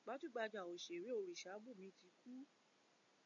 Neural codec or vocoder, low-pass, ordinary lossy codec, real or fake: none; 7.2 kHz; MP3, 64 kbps; real